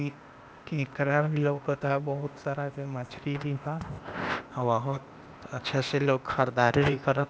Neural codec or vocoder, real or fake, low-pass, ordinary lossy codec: codec, 16 kHz, 0.8 kbps, ZipCodec; fake; none; none